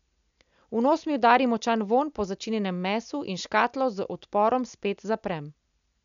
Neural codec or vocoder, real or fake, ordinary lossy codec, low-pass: none; real; none; 7.2 kHz